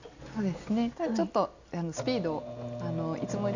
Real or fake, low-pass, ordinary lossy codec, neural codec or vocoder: real; 7.2 kHz; none; none